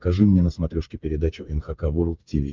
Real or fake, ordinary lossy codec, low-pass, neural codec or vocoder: fake; Opus, 24 kbps; 7.2 kHz; codec, 16 kHz, 8 kbps, FreqCodec, smaller model